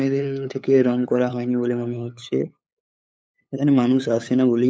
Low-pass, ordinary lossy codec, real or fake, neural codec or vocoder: none; none; fake; codec, 16 kHz, 8 kbps, FunCodec, trained on LibriTTS, 25 frames a second